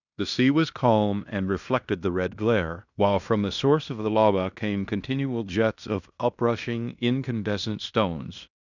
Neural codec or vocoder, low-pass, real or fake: codec, 16 kHz in and 24 kHz out, 0.9 kbps, LongCat-Audio-Codec, fine tuned four codebook decoder; 7.2 kHz; fake